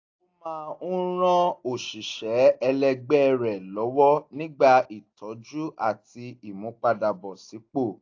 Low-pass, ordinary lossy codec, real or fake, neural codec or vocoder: 7.2 kHz; AAC, 48 kbps; real; none